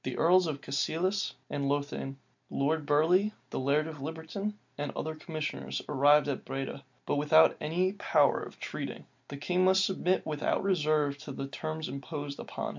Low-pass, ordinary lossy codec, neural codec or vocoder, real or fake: 7.2 kHz; MP3, 64 kbps; none; real